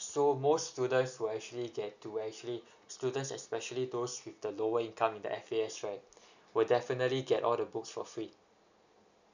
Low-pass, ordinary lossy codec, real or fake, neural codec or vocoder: 7.2 kHz; none; real; none